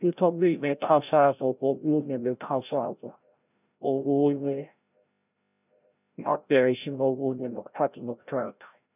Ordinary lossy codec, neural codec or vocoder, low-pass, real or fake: none; codec, 16 kHz, 0.5 kbps, FreqCodec, larger model; 3.6 kHz; fake